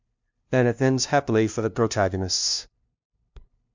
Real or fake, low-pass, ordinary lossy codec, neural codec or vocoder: fake; 7.2 kHz; MP3, 64 kbps; codec, 16 kHz, 0.5 kbps, FunCodec, trained on LibriTTS, 25 frames a second